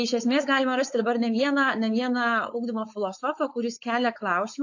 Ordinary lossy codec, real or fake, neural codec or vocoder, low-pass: AAC, 48 kbps; fake; codec, 16 kHz, 4.8 kbps, FACodec; 7.2 kHz